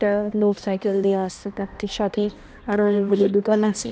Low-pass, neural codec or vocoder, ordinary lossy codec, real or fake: none; codec, 16 kHz, 1 kbps, X-Codec, HuBERT features, trained on balanced general audio; none; fake